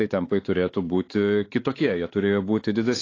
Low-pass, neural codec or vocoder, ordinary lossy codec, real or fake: 7.2 kHz; codec, 16 kHz, 4 kbps, X-Codec, WavLM features, trained on Multilingual LibriSpeech; AAC, 32 kbps; fake